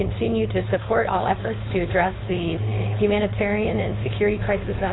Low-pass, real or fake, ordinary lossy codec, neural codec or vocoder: 7.2 kHz; fake; AAC, 16 kbps; codec, 16 kHz, 4.8 kbps, FACodec